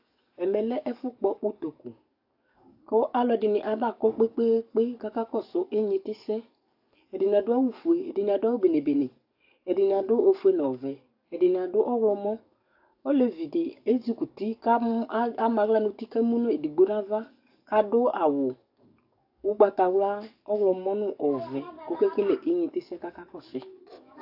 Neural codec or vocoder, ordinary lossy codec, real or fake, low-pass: codec, 44.1 kHz, 7.8 kbps, DAC; AAC, 48 kbps; fake; 5.4 kHz